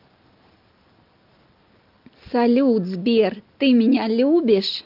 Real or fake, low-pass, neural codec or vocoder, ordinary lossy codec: real; 5.4 kHz; none; Opus, 24 kbps